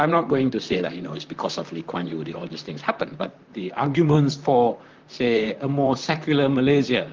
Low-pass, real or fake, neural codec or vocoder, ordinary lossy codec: 7.2 kHz; fake; vocoder, 44.1 kHz, 128 mel bands, Pupu-Vocoder; Opus, 24 kbps